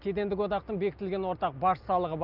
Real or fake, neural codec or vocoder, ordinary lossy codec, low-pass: real; none; Opus, 24 kbps; 5.4 kHz